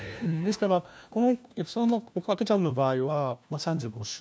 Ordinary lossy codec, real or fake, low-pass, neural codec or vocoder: none; fake; none; codec, 16 kHz, 1 kbps, FunCodec, trained on LibriTTS, 50 frames a second